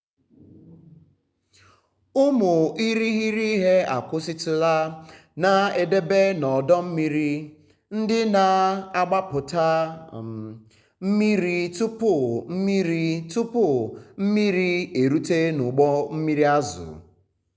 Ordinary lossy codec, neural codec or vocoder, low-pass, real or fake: none; none; none; real